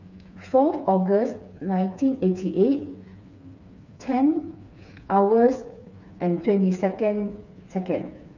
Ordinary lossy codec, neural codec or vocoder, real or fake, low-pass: none; codec, 16 kHz, 4 kbps, FreqCodec, smaller model; fake; 7.2 kHz